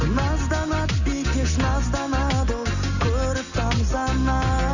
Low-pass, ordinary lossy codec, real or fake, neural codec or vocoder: 7.2 kHz; none; real; none